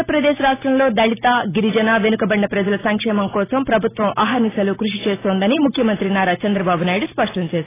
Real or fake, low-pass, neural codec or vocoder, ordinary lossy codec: real; 3.6 kHz; none; AAC, 16 kbps